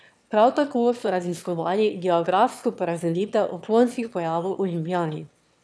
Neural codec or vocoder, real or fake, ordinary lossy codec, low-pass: autoencoder, 22.05 kHz, a latent of 192 numbers a frame, VITS, trained on one speaker; fake; none; none